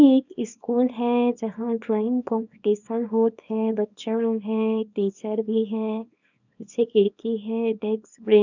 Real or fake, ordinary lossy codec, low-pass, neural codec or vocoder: fake; none; 7.2 kHz; codec, 24 kHz, 0.9 kbps, WavTokenizer, small release